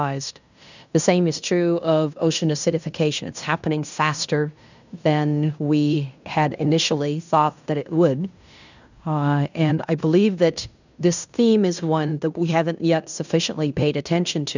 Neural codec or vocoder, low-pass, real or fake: codec, 16 kHz in and 24 kHz out, 0.9 kbps, LongCat-Audio-Codec, fine tuned four codebook decoder; 7.2 kHz; fake